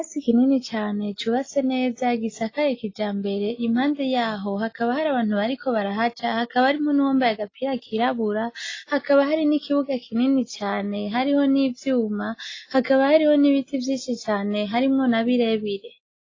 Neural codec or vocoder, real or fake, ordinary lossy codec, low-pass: none; real; AAC, 32 kbps; 7.2 kHz